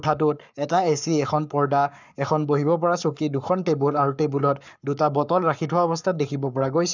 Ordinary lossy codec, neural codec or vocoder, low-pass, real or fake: none; codec, 44.1 kHz, 7.8 kbps, Pupu-Codec; 7.2 kHz; fake